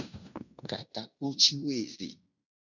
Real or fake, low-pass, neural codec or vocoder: fake; 7.2 kHz; codec, 16 kHz in and 24 kHz out, 0.9 kbps, LongCat-Audio-Codec, fine tuned four codebook decoder